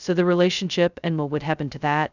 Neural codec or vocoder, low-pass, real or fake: codec, 16 kHz, 0.2 kbps, FocalCodec; 7.2 kHz; fake